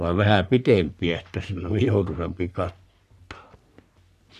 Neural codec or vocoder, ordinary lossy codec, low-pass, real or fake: codec, 44.1 kHz, 3.4 kbps, Pupu-Codec; none; 14.4 kHz; fake